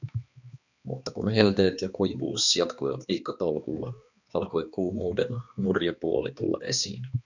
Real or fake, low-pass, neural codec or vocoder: fake; 7.2 kHz; codec, 16 kHz, 2 kbps, X-Codec, HuBERT features, trained on balanced general audio